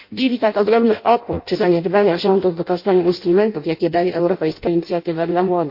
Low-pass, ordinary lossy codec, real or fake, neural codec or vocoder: 5.4 kHz; MP3, 32 kbps; fake; codec, 16 kHz in and 24 kHz out, 0.6 kbps, FireRedTTS-2 codec